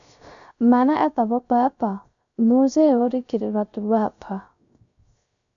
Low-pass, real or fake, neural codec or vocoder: 7.2 kHz; fake; codec, 16 kHz, 0.3 kbps, FocalCodec